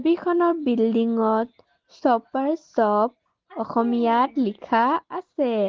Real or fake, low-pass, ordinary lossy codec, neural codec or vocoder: real; 7.2 kHz; Opus, 16 kbps; none